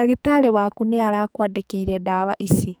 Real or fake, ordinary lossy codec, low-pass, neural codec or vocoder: fake; none; none; codec, 44.1 kHz, 2.6 kbps, SNAC